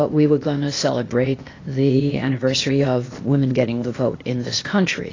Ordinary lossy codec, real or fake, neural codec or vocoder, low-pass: AAC, 32 kbps; fake; codec, 16 kHz, 0.8 kbps, ZipCodec; 7.2 kHz